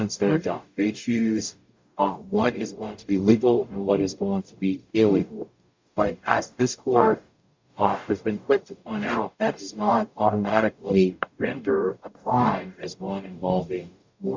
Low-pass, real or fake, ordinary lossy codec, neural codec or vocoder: 7.2 kHz; fake; MP3, 64 kbps; codec, 44.1 kHz, 0.9 kbps, DAC